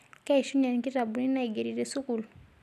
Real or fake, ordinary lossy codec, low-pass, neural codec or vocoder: real; none; 14.4 kHz; none